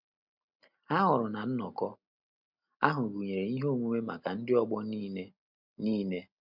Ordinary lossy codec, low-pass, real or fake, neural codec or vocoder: MP3, 48 kbps; 5.4 kHz; real; none